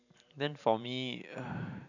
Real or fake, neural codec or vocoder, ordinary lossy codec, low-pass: fake; vocoder, 44.1 kHz, 128 mel bands every 512 samples, BigVGAN v2; none; 7.2 kHz